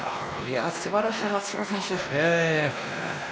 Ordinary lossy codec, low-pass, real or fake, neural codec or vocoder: none; none; fake; codec, 16 kHz, 1 kbps, X-Codec, WavLM features, trained on Multilingual LibriSpeech